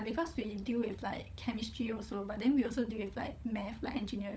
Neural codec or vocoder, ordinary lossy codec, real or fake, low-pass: codec, 16 kHz, 16 kbps, FunCodec, trained on LibriTTS, 50 frames a second; none; fake; none